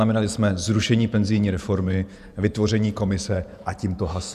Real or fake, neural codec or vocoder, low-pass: fake; vocoder, 48 kHz, 128 mel bands, Vocos; 14.4 kHz